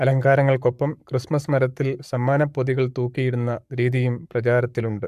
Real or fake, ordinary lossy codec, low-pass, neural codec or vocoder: fake; AAC, 96 kbps; 14.4 kHz; codec, 44.1 kHz, 7.8 kbps, Pupu-Codec